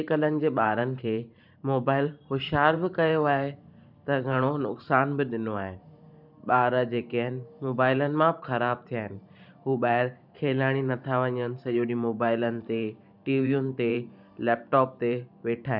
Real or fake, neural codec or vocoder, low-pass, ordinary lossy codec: fake; vocoder, 44.1 kHz, 128 mel bands every 512 samples, BigVGAN v2; 5.4 kHz; none